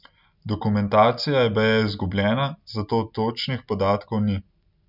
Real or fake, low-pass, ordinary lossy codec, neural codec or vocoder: real; 5.4 kHz; none; none